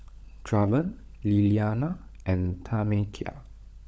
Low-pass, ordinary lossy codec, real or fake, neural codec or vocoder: none; none; fake; codec, 16 kHz, 16 kbps, FunCodec, trained on LibriTTS, 50 frames a second